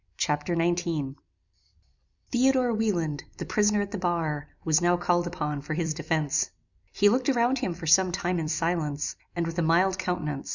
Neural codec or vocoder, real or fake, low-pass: vocoder, 44.1 kHz, 128 mel bands every 256 samples, BigVGAN v2; fake; 7.2 kHz